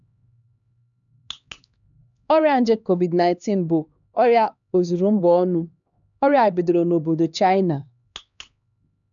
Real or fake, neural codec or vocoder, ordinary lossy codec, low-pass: fake; codec, 16 kHz, 2 kbps, X-Codec, HuBERT features, trained on LibriSpeech; none; 7.2 kHz